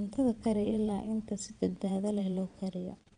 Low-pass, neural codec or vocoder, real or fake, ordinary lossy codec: 9.9 kHz; vocoder, 22.05 kHz, 80 mel bands, Vocos; fake; MP3, 96 kbps